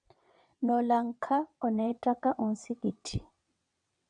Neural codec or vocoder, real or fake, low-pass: vocoder, 22.05 kHz, 80 mel bands, WaveNeXt; fake; 9.9 kHz